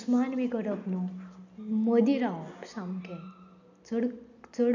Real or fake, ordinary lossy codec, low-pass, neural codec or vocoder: real; none; 7.2 kHz; none